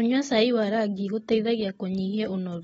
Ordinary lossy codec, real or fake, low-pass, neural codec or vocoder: AAC, 24 kbps; real; 9.9 kHz; none